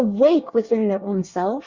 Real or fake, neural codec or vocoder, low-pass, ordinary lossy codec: fake; codec, 24 kHz, 1 kbps, SNAC; 7.2 kHz; Opus, 64 kbps